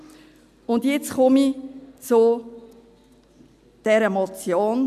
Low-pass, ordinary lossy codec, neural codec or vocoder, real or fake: 14.4 kHz; none; none; real